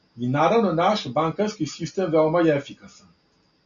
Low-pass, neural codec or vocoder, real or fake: 7.2 kHz; none; real